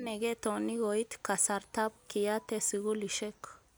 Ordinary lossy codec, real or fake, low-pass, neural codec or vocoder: none; real; none; none